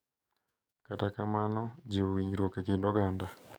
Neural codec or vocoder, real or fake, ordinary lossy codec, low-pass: codec, 44.1 kHz, 7.8 kbps, DAC; fake; none; none